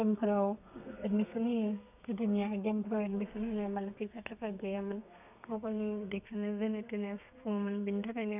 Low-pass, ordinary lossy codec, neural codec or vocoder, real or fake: 3.6 kHz; none; codec, 32 kHz, 1.9 kbps, SNAC; fake